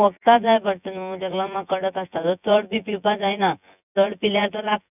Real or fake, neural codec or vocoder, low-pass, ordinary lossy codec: fake; vocoder, 24 kHz, 100 mel bands, Vocos; 3.6 kHz; none